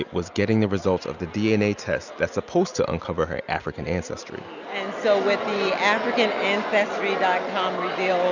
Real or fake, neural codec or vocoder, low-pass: real; none; 7.2 kHz